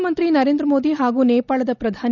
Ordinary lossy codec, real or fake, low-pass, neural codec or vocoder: none; real; 7.2 kHz; none